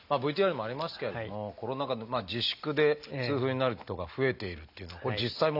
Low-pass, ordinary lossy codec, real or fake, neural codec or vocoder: 5.4 kHz; none; real; none